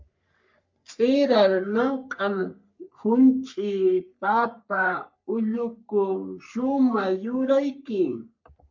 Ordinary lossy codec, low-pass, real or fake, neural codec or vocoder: MP3, 48 kbps; 7.2 kHz; fake; codec, 44.1 kHz, 3.4 kbps, Pupu-Codec